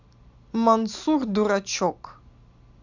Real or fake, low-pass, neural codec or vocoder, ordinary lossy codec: real; 7.2 kHz; none; none